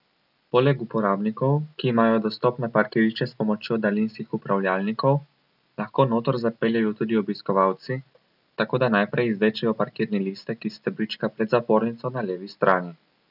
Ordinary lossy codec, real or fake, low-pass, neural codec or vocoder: none; real; 5.4 kHz; none